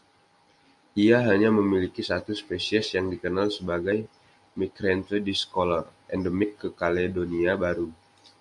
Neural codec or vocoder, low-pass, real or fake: none; 10.8 kHz; real